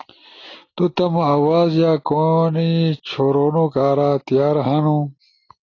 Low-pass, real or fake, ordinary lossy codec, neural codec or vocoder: 7.2 kHz; real; AAC, 32 kbps; none